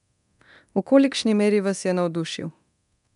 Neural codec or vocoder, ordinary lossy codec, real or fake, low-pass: codec, 24 kHz, 0.9 kbps, DualCodec; none; fake; 10.8 kHz